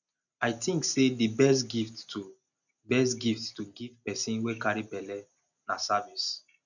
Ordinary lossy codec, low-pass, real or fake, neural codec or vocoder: none; 7.2 kHz; real; none